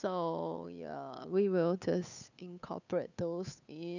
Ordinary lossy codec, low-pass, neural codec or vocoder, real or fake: none; 7.2 kHz; codec, 16 kHz, 8 kbps, FunCodec, trained on Chinese and English, 25 frames a second; fake